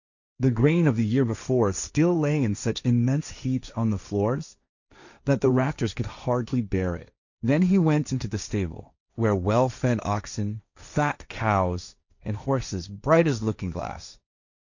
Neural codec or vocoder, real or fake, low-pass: codec, 16 kHz, 1.1 kbps, Voila-Tokenizer; fake; 7.2 kHz